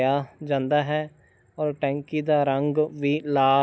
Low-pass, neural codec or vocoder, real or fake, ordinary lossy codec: none; none; real; none